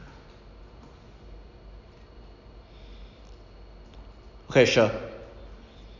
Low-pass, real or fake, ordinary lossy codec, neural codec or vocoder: 7.2 kHz; real; none; none